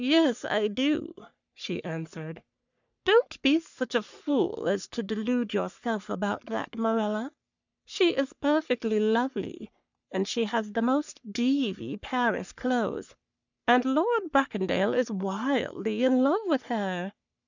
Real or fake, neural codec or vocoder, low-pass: fake; codec, 44.1 kHz, 3.4 kbps, Pupu-Codec; 7.2 kHz